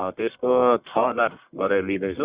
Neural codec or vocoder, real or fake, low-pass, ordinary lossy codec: codec, 44.1 kHz, 1.7 kbps, Pupu-Codec; fake; 3.6 kHz; Opus, 64 kbps